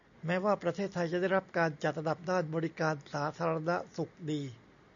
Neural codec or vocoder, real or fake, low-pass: none; real; 7.2 kHz